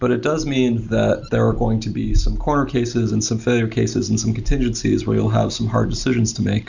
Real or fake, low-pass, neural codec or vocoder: real; 7.2 kHz; none